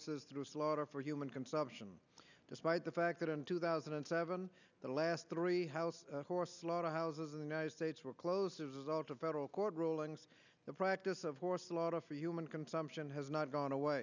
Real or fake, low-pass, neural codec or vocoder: real; 7.2 kHz; none